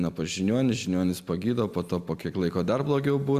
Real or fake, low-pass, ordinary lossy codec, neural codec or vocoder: real; 14.4 kHz; Opus, 64 kbps; none